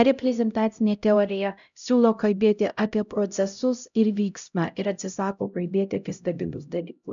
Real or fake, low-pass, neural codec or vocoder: fake; 7.2 kHz; codec, 16 kHz, 0.5 kbps, X-Codec, HuBERT features, trained on LibriSpeech